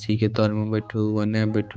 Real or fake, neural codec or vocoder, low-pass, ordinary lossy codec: fake; codec, 16 kHz, 4 kbps, X-Codec, HuBERT features, trained on balanced general audio; none; none